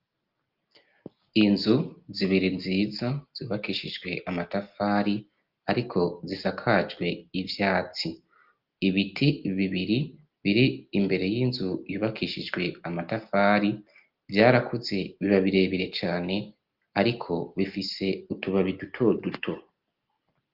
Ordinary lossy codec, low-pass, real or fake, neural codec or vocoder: Opus, 32 kbps; 5.4 kHz; real; none